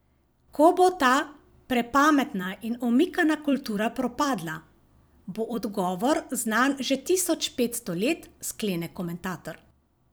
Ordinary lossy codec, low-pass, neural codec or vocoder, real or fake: none; none; vocoder, 44.1 kHz, 128 mel bands every 256 samples, BigVGAN v2; fake